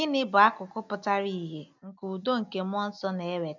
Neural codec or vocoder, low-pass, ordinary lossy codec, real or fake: none; 7.2 kHz; none; real